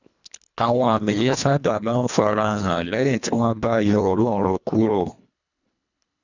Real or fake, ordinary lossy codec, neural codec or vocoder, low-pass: fake; none; codec, 24 kHz, 1.5 kbps, HILCodec; 7.2 kHz